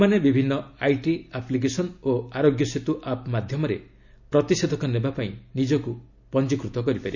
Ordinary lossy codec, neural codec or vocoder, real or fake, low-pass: none; none; real; 7.2 kHz